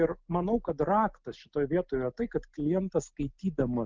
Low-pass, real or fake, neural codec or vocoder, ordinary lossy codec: 7.2 kHz; real; none; Opus, 32 kbps